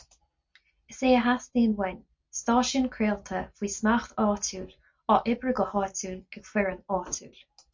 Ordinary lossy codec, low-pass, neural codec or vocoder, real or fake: MP3, 48 kbps; 7.2 kHz; none; real